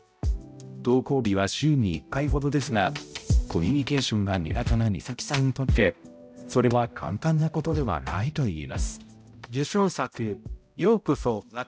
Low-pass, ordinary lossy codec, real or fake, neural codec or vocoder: none; none; fake; codec, 16 kHz, 0.5 kbps, X-Codec, HuBERT features, trained on balanced general audio